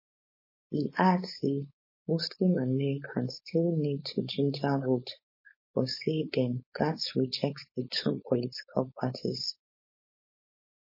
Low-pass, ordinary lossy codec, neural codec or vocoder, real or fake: 5.4 kHz; MP3, 24 kbps; codec, 16 kHz, 4.8 kbps, FACodec; fake